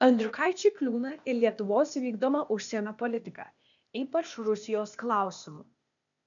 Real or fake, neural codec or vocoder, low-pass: fake; codec, 16 kHz, 0.8 kbps, ZipCodec; 7.2 kHz